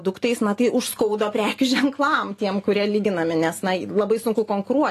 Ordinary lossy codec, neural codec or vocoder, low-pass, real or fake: AAC, 48 kbps; none; 14.4 kHz; real